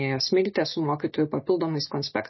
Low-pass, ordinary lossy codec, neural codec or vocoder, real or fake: 7.2 kHz; MP3, 24 kbps; none; real